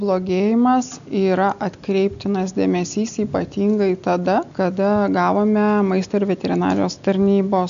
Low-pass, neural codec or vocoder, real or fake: 7.2 kHz; none; real